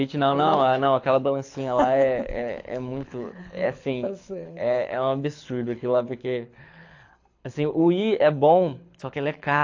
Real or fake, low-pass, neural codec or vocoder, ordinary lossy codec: fake; 7.2 kHz; codec, 24 kHz, 6 kbps, HILCodec; AAC, 48 kbps